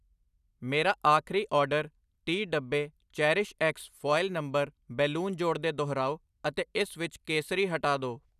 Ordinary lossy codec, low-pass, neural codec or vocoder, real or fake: none; 14.4 kHz; none; real